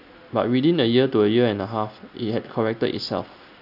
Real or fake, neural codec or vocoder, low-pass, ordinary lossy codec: real; none; 5.4 kHz; none